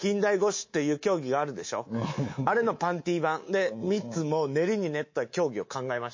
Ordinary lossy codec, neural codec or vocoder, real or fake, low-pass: MP3, 32 kbps; codec, 24 kHz, 3.1 kbps, DualCodec; fake; 7.2 kHz